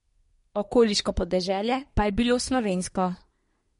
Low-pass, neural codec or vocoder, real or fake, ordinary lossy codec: 10.8 kHz; codec, 24 kHz, 1 kbps, SNAC; fake; MP3, 48 kbps